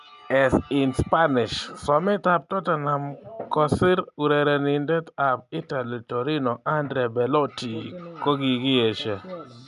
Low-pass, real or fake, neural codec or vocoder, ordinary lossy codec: 10.8 kHz; real; none; none